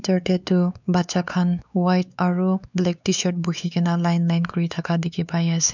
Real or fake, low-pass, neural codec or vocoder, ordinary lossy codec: fake; 7.2 kHz; codec, 16 kHz, 4 kbps, X-Codec, WavLM features, trained on Multilingual LibriSpeech; none